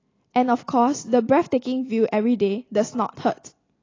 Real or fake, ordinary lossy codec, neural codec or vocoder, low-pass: real; AAC, 32 kbps; none; 7.2 kHz